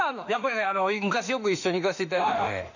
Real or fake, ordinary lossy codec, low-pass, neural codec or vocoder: fake; none; 7.2 kHz; autoencoder, 48 kHz, 32 numbers a frame, DAC-VAE, trained on Japanese speech